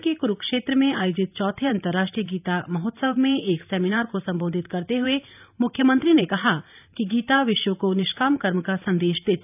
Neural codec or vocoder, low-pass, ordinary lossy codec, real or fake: vocoder, 44.1 kHz, 128 mel bands every 512 samples, BigVGAN v2; 3.6 kHz; none; fake